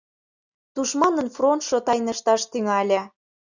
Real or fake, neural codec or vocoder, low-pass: real; none; 7.2 kHz